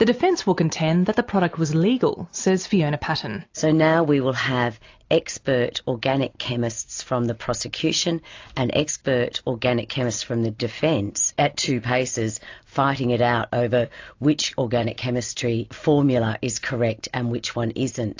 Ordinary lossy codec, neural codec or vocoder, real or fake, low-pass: AAC, 48 kbps; none; real; 7.2 kHz